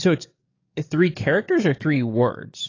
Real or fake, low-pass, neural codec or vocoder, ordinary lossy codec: fake; 7.2 kHz; codec, 44.1 kHz, 7.8 kbps, DAC; AAC, 32 kbps